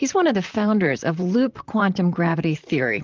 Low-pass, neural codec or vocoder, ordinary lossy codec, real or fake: 7.2 kHz; vocoder, 44.1 kHz, 128 mel bands, Pupu-Vocoder; Opus, 24 kbps; fake